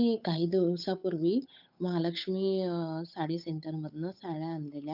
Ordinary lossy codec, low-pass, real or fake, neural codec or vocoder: none; 5.4 kHz; fake; codec, 16 kHz, 8 kbps, FunCodec, trained on Chinese and English, 25 frames a second